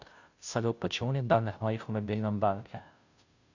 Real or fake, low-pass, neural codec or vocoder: fake; 7.2 kHz; codec, 16 kHz, 0.5 kbps, FunCodec, trained on Chinese and English, 25 frames a second